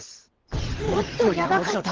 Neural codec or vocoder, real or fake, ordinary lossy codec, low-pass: vocoder, 22.05 kHz, 80 mel bands, WaveNeXt; fake; Opus, 16 kbps; 7.2 kHz